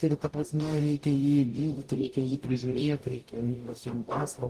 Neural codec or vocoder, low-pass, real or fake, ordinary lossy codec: codec, 44.1 kHz, 0.9 kbps, DAC; 14.4 kHz; fake; Opus, 24 kbps